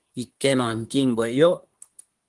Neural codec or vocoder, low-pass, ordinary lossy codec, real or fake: codec, 24 kHz, 1 kbps, SNAC; 10.8 kHz; Opus, 24 kbps; fake